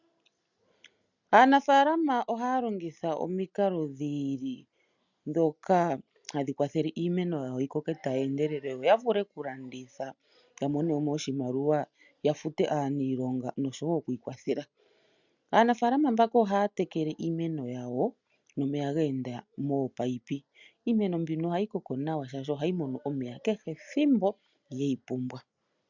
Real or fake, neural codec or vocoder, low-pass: real; none; 7.2 kHz